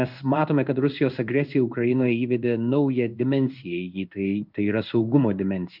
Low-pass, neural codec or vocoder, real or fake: 5.4 kHz; codec, 16 kHz in and 24 kHz out, 1 kbps, XY-Tokenizer; fake